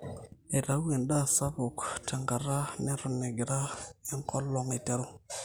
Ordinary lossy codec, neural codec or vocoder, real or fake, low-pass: none; none; real; none